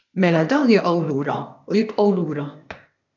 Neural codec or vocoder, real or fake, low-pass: codec, 16 kHz, 0.8 kbps, ZipCodec; fake; 7.2 kHz